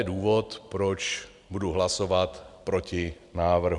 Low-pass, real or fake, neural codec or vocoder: 10.8 kHz; real; none